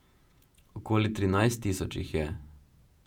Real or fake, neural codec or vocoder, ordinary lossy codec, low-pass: fake; vocoder, 48 kHz, 128 mel bands, Vocos; none; 19.8 kHz